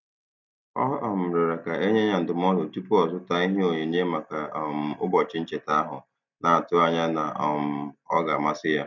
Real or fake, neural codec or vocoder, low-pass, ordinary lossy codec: real; none; 7.2 kHz; none